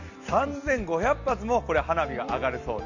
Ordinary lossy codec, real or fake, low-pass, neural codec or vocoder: none; real; 7.2 kHz; none